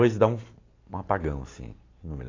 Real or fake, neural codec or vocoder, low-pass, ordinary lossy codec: real; none; 7.2 kHz; AAC, 32 kbps